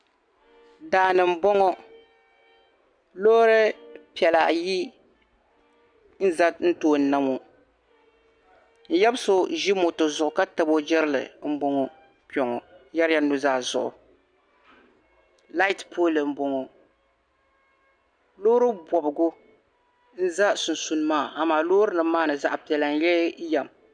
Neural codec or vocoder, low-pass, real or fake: none; 9.9 kHz; real